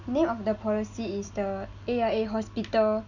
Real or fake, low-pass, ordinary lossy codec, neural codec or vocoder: real; 7.2 kHz; none; none